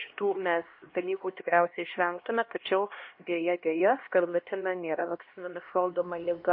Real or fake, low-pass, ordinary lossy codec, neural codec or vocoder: fake; 5.4 kHz; MP3, 32 kbps; codec, 16 kHz, 1 kbps, X-Codec, HuBERT features, trained on LibriSpeech